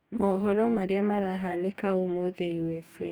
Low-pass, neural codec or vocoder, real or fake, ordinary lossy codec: none; codec, 44.1 kHz, 2.6 kbps, DAC; fake; none